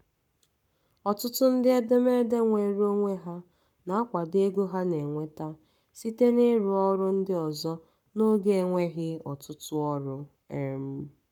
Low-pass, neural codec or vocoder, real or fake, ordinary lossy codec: 19.8 kHz; codec, 44.1 kHz, 7.8 kbps, Pupu-Codec; fake; none